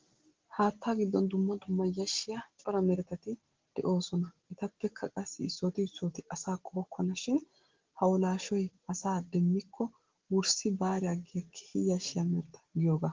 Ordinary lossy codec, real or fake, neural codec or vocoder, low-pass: Opus, 16 kbps; real; none; 7.2 kHz